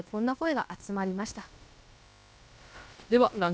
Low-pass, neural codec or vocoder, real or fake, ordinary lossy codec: none; codec, 16 kHz, about 1 kbps, DyCAST, with the encoder's durations; fake; none